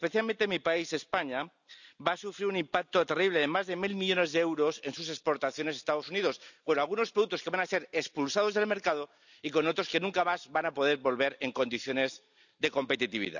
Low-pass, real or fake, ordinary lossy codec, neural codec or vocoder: 7.2 kHz; real; none; none